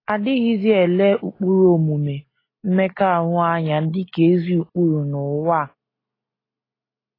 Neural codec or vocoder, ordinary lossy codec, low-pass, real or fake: none; AAC, 24 kbps; 5.4 kHz; real